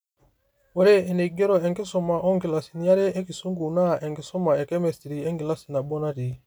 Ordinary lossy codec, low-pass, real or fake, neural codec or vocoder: none; none; real; none